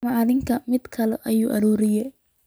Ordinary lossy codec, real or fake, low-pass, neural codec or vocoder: none; real; none; none